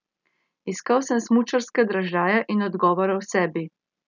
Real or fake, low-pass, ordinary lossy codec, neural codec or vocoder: real; 7.2 kHz; none; none